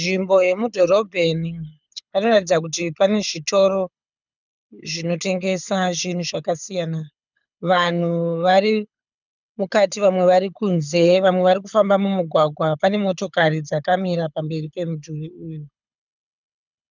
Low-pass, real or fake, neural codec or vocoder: 7.2 kHz; fake; codec, 24 kHz, 6 kbps, HILCodec